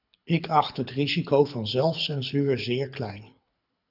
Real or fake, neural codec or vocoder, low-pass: fake; codec, 24 kHz, 6 kbps, HILCodec; 5.4 kHz